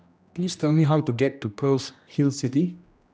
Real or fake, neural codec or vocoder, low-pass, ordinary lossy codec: fake; codec, 16 kHz, 1 kbps, X-Codec, HuBERT features, trained on general audio; none; none